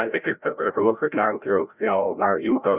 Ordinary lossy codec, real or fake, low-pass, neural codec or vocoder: Opus, 64 kbps; fake; 3.6 kHz; codec, 16 kHz, 0.5 kbps, FreqCodec, larger model